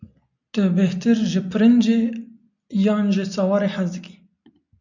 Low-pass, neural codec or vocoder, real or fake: 7.2 kHz; none; real